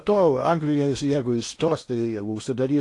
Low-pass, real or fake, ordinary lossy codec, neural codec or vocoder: 10.8 kHz; fake; AAC, 48 kbps; codec, 16 kHz in and 24 kHz out, 0.8 kbps, FocalCodec, streaming, 65536 codes